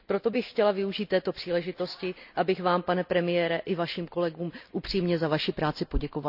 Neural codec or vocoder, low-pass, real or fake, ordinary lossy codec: none; 5.4 kHz; real; none